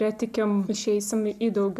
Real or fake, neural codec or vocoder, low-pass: real; none; 14.4 kHz